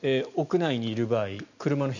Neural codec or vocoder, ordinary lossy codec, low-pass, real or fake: none; none; 7.2 kHz; real